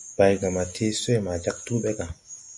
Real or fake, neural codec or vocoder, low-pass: real; none; 10.8 kHz